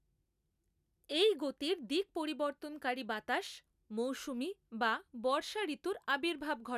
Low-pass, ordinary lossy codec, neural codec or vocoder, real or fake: 14.4 kHz; none; none; real